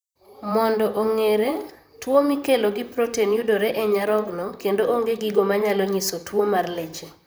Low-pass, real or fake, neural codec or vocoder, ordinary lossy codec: none; fake; vocoder, 44.1 kHz, 128 mel bands, Pupu-Vocoder; none